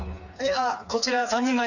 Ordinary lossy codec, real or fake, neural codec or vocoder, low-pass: none; fake; codec, 16 kHz, 4 kbps, FreqCodec, smaller model; 7.2 kHz